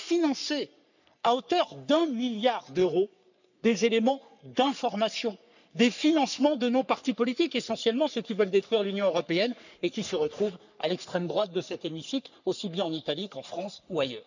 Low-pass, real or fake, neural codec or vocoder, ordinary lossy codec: 7.2 kHz; fake; codec, 44.1 kHz, 3.4 kbps, Pupu-Codec; none